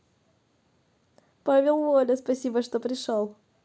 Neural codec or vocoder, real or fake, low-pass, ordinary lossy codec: none; real; none; none